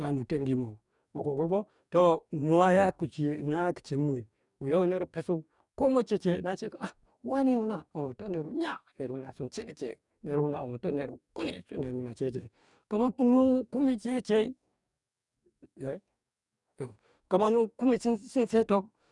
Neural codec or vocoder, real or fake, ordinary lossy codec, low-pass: codec, 44.1 kHz, 2.6 kbps, DAC; fake; none; 10.8 kHz